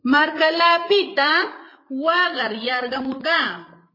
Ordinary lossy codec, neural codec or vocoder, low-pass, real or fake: MP3, 24 kbps; codec, 16 kHz, 8 kbps, FreqCodec, larger model; 5.4 kHz; fake